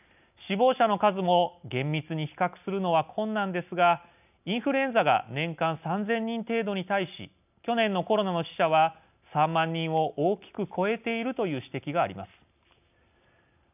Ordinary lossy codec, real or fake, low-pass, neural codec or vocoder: none; real; 3.6 kHz; none